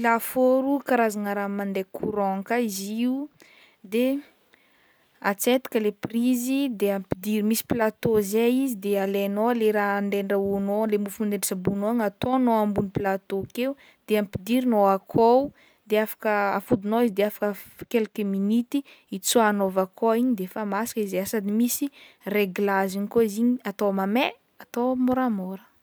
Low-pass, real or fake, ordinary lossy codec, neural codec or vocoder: none; real; none; none